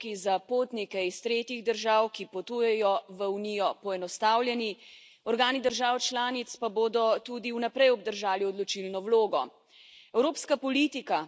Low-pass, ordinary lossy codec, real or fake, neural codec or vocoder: none; none; real; none